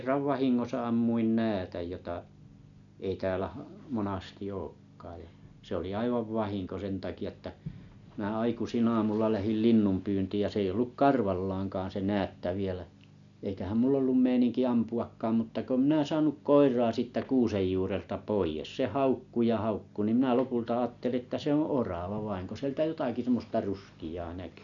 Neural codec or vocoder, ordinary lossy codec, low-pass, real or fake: none; none; 7.2 kHz; real